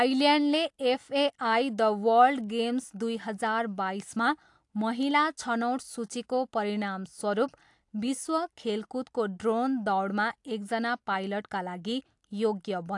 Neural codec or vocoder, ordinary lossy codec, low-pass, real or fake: none; AAC, 64 kbps; 10.8 kHz; real